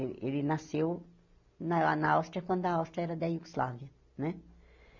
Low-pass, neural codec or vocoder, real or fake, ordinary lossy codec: 7.2 kHz; none; real; MP3, 64 kbps